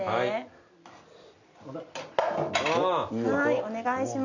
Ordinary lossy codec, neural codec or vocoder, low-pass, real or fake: none; none; 7.2 kHz; real